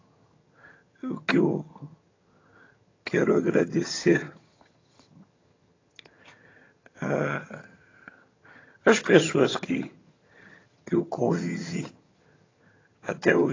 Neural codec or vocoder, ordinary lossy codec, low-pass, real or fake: vocoder, 22.05 kHz, 80 mel bands, HiFi-GAN; AAC, 32 kbps; 7.2 kHz; fake